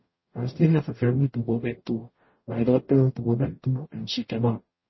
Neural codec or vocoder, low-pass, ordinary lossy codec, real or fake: codec, 44.1 kHz, 0.9 kbps, DAC; 7.2 kHz; MP3, 24 kbps; fake